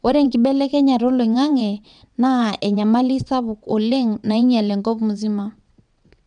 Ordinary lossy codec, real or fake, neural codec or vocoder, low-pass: none; fake; vocoder, 22.05 kHz, 80 mel bands, WaveNeXt; 9.9 kHz